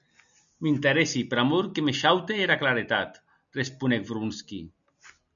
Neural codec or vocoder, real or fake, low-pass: none; real; 7.2 kHz